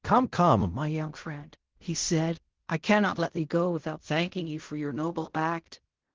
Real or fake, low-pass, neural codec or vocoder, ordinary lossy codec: fake; 7.2 kHz; codec, 16 kHz in and 24 kHz out, 0.4 kbps, LongCat-Audio-Codec, fine tuned four codebook decoder; Opus, 16 kbps